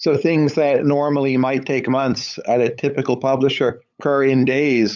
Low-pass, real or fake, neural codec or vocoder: 7.2 kHz; fake; codec, 16 kHz, 8 kbps, FunCodec, trained on LibriTTS, 25 frames a second